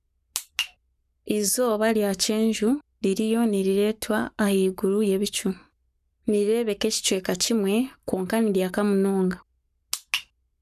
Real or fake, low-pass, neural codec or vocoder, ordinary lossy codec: fake; 14.4 kHz; codec, 44.1 kHz, 7.8 kbps, Pupu-Codec; none